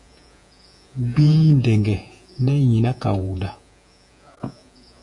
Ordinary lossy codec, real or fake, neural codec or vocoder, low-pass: MP3, 64 kbps; fake; vocoder, 48 kHz, 128 mel bands, Vocos; 10.8 kHz